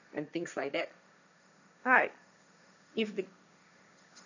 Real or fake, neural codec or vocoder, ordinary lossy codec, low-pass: fake; codec, 16 kHz, 1.1 kbps, Voila-Tokenizer; none; 7.2 kHz